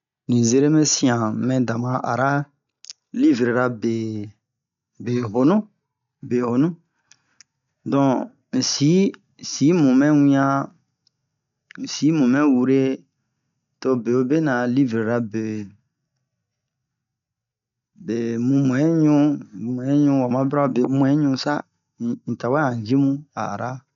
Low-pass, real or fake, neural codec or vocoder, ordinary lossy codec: 7.2 kHz; real; none; none